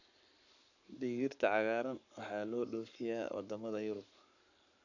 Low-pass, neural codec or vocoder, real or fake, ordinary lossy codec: 7.2 kHz; codec, 44.1 kHz, 7.8 kbps, Pupu-Codec; fake; none